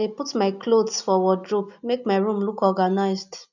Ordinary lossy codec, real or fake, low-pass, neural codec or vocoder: none; real; 7.2 kHz; none